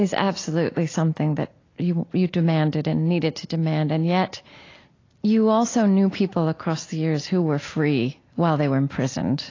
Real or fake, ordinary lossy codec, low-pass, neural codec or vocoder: real; AAC, 32 kbps; 7.2 kHz; none